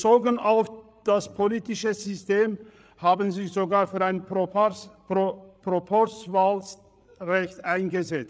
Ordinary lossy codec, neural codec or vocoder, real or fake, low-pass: none; codec, 16 kHz, 8 kbps, FreqCodec, larger model; fake; none